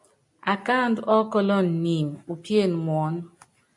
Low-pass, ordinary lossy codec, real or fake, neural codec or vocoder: 10.8 kHz; MP3, 64 kbps; real; none